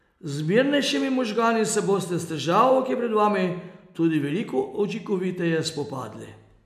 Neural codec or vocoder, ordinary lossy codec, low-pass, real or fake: none; none; 14.4 kHz; real